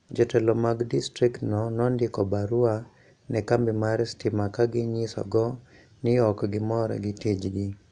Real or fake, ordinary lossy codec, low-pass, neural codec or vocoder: real; none; 9.9 kHz; none